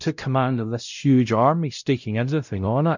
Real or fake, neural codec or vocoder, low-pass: fake; codec, 16 kHz, 0.5 kbps, X-Codec, WavLM features, trained on Multilingual LibriSpeech; 7.2 kHz